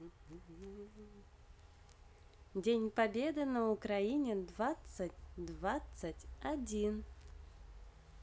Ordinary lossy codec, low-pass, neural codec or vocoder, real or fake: none; none; none; real